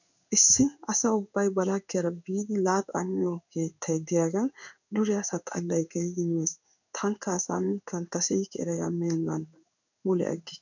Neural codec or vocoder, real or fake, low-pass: codec, 16 kHz in and 24 kHz out, 1 kbps, XY-Tokenizer; fake; 7.2 kHz